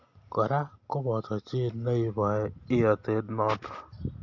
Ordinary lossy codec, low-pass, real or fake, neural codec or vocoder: MP3, 64 kbps; 7.2 kHz; real; none